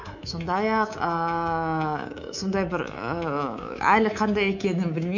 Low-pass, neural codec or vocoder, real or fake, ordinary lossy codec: 7.2 kHz; codec, 24 kHz, 3.1 kbps, DualCodec; fake; none